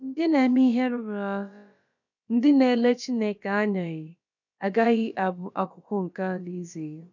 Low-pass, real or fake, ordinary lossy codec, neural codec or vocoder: 7.2 kHz; fake; none; codec, 16 kHz, about 1 kbps, DyCAST, with the encoder's durations